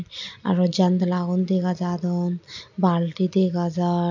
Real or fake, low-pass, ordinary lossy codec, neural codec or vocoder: real; 7.2 kHz; none; none